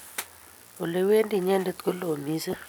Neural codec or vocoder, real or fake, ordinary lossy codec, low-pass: none; real; none; none